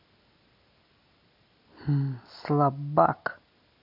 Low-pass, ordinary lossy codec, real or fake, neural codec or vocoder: 5.4 kHz; MP3, 48 kbps; real; none